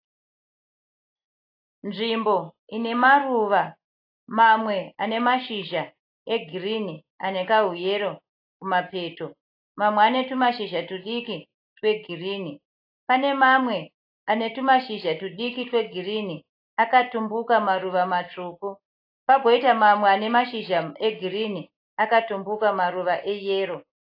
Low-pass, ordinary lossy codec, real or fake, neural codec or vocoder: 5.4 kHz; AAC, 32 kbps; real; none